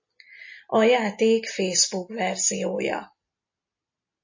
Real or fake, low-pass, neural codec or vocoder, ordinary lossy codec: real; 7.2 kHz; none; MP3, 32 kbps